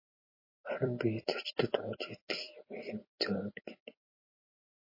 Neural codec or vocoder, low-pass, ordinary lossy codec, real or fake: vocoder, 44.1 kHz, 128 mel bands every 256 samples, BigVGAN v2; 5.4 kHz; MP3, 24 kbps; fake